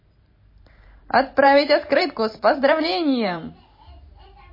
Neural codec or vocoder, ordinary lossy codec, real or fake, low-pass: vocoder, 44.1 kHz, 128 mel bands every 512 samples, BigVGAN v2; MP3, 24 kbps; fake; 5.4 kHz